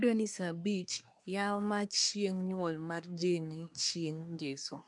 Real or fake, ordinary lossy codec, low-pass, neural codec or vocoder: fake; none; 10.8 kHz; codec, 24 kHz, 1 kbps, SNAC